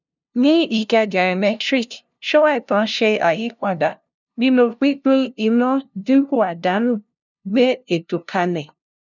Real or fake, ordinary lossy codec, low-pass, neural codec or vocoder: fake; none; 7.2 kHz; codec, 16 kHz, 0.5 kbps, FunCodec, trained on LibriTTS, 25 frames a second